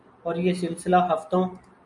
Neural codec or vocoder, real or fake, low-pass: none; real; 10.8 kHz